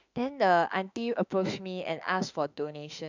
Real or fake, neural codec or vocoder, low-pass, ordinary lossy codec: fake; autoencoder, 48 kHz, 32 numbers a frame, DAC-VAE, trained on Japanese speech; 7.2 kHz; none